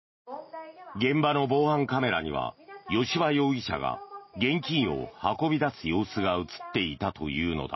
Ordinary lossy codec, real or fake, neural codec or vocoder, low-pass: MP3, 24 kbps; real; none; 7.2 kHz